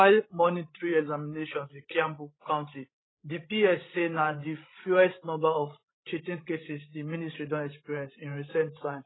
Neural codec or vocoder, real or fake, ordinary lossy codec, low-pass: codec, 16 kHz, 16 kbps, FreqCodec, larger model; fake; AAC, 16 kbps; 7.2 kHz